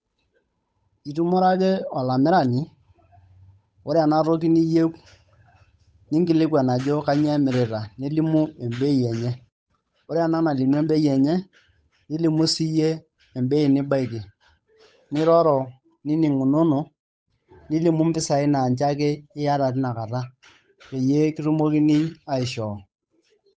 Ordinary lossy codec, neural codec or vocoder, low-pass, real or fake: none; codec, 16 kHz, 8 kbps, FunCodec, trained on Chinese and English, 25 frames a second; none; fake